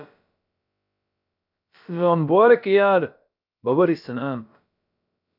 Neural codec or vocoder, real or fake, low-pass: codec, 16 kHz, about 1 kbps, DyCAST, with the encoder's durations; fake; 5.4 kHz